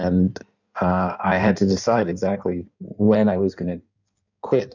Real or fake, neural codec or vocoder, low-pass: fake; codec, 16 kHz in and 24 kHz out, 1.1 kbps, FireRedTTS-2 codec; 7.2 kHz